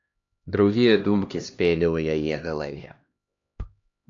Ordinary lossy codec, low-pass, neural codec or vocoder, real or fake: AAC, 64 kbps; 7.2 kHz; codec, 16 kHz, 1 kbps, X-Codec, HuBERT features, trained on LibriSpeech; fake